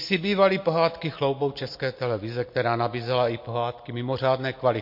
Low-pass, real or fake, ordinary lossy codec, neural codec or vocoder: 5.4 kHz; real; MP3, 32 kbps; none